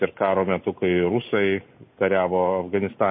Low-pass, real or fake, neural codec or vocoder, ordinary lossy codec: 7.2 kHz; real; none; MP3, 24 kbps